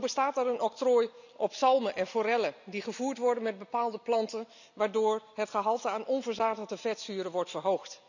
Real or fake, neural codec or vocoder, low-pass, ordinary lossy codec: real; none; 7.2 kHz; none